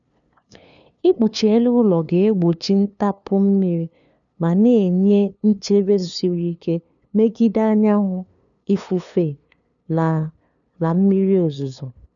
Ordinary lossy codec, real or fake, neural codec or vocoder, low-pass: none; fake; codec, 16 kHz, 2 kbps, FunCodec, trained on LibriTTS, 25 frames a second; 7.2 kHz